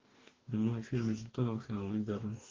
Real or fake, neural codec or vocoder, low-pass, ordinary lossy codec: fake; codec, 44.1 kHz, 2.6 kbps, DAC; 7.2 kHz; Opus, 16 kbps